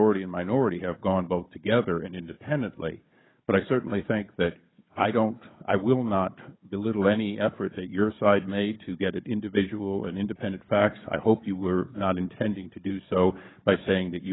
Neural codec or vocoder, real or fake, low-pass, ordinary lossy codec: codec, 16 kHz, 16 kbps, FunCodec, trained on Chinese and English, 50 frames a second; fake; 7.2 kHz; AAC, 16 kbps